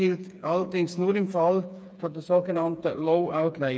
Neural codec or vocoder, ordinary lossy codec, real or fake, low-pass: codec, 16 kHz, 4 kbps, FreqCodec, smaller model; none; fake; none